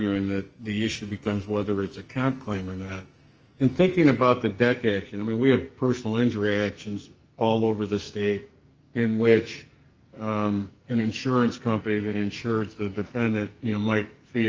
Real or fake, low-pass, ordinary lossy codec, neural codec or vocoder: fake; 7.2 kHz; Opus, 24 kbps; codec, 44.1 kHz, 2.6 kbps, SNAC